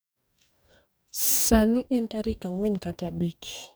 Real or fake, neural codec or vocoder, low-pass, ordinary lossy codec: fake; codec, 44.1 kHz, 2.6 kbps, DAC; none; none